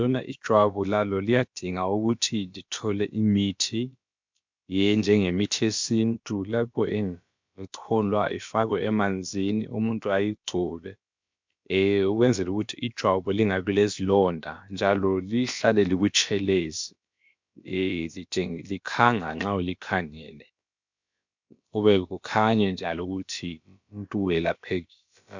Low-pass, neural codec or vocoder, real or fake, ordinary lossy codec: 7.2 kHz; codec, 16 kHz, about 1 kbps, DyCAST, with the encoder's durations; fake; MP3, 64 kbps